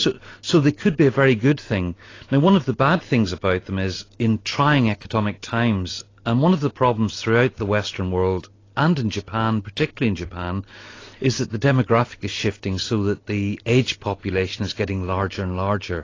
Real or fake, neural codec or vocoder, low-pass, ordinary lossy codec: real; none; 7.2 kHz; AAC, 32 kbps